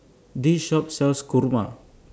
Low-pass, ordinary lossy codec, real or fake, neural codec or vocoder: none; none; real; none